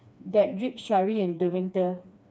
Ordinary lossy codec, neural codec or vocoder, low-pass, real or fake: none; codec, 16 kHz, 2 kbps, FreqCodec, smaller model; none; fake